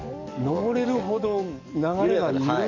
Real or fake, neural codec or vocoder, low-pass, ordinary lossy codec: fake; codec, 44.1 kHz, 7.8 kbps, DAC; 7.2 kHz; MP3, 64 kbps